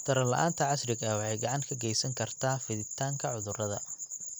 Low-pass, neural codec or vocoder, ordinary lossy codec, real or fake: none; none; none; real